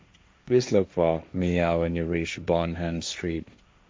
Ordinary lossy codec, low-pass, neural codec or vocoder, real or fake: none; none; codec, 16 kHz, 1.1 kbps, Voila-Tokenizer; fake